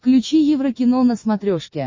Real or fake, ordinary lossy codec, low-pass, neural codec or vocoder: real; MP3, 32 kbps; 7.2 kHz; none